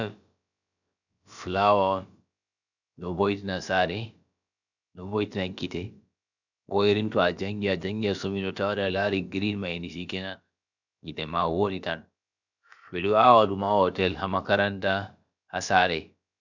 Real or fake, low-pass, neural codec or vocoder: fake; 7.2 kHz; codec, 16 kHz, about 1 kbps, DyCAST, with the encoder's durations